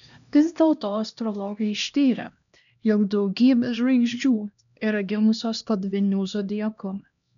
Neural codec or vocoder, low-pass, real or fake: codec, 16 kHz, 1 kbps, X-Codec, HuBERT features, trained on LibriSpeech; 7.2 kHz; fake